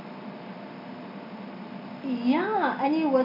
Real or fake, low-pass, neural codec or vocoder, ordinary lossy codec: real; 5.4 kHz; none; none